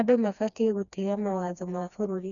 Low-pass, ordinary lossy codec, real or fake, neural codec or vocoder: 7.2 kHz; none; fake; codec, 16 kHz, 2 kbps, FreqCodec, smaller model